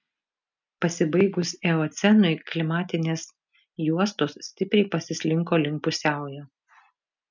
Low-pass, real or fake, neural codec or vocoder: 7.2 kHz; real; none